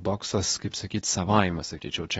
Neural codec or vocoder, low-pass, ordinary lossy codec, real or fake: codec, 24 kHz, 0.9 kbps, WavTokenizer, medium speech release version 2; 10.8 kHz; AAC, 24 kbps; fake